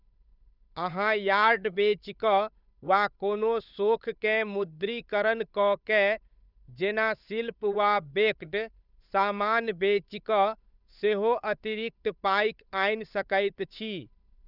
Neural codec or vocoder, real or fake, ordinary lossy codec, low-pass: codec, 16 kHz, 8 kbps, FunCodec, trained on Chinese and English, 25 frames a second; fake; none; 5.4 kHz